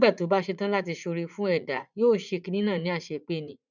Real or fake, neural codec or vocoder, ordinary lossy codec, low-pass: fake; vocoder, 44.1 kHz, 80 mel bands, Vocos; none; 7.2 kHz